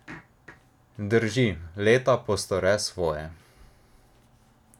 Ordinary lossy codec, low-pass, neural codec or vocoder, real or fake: none; 19.8 kHz; none; real